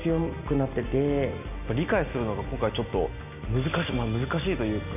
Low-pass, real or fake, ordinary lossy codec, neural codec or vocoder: 3.6 kHz; real; none; none